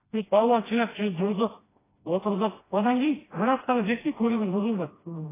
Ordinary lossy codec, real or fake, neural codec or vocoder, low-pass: AAC, 16 kbps; fake; codec, 16 kHz, 1 kbps, FreqCodec, smaller model; 3.6 kHz